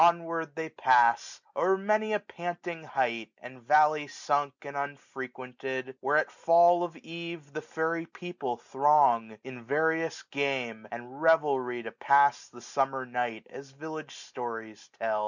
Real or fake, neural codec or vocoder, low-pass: real; none; 7.2 kHz